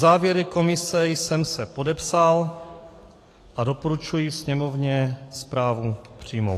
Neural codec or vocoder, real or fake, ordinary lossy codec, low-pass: codec, 44.1 kHz, 7.8 kbps, DAC; fake; AAC, 48 kbps; 14.4 kHz